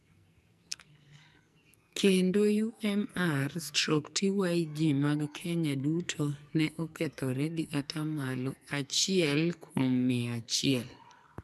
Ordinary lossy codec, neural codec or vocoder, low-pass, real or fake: none; codec, 44.1 kHz, 2.6 kbps, SNAC; 14.4 kHz; fake